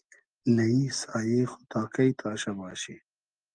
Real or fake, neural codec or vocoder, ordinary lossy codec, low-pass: real; none; Opus, 32 kbps; 9.9 kHz